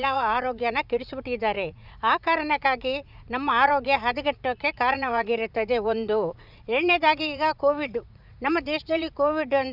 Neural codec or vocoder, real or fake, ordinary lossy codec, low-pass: vocoder, 44.1 kHz, 80 mel bands, Vocos; fake; none; 5.4 kHz